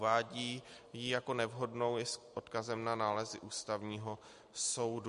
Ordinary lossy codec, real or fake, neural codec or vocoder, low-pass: MP3, 48 kbps; real; none; 14.4 kHz